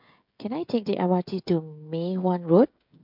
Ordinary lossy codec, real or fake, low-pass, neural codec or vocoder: MP3, 48 kbps; fake; 5.4 kHz; codec, 16 kHz, 16 kbps, FreqCodec, smaller model